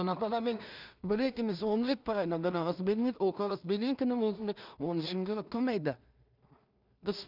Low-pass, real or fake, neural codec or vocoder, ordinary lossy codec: 5.4 kHz; fake; codec, 16 kHz in and 24 kHz out, 0.4 kbps, LongCat-Audio-Codec, two codebook decoder; none